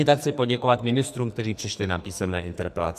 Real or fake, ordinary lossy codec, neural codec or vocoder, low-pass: fake; MP3, 96 kbps; codec, 44.1 kHz, 2.6 kbps, SNAC; 14.4 kHz